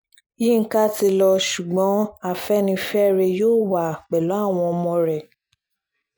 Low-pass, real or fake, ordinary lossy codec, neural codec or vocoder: none; real; none; none